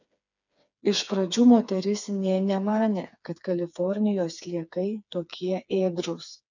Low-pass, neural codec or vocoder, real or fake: 7.2 kHz; codec, 16 kHz, 4 kbps, FreqCodec, smaller model; fake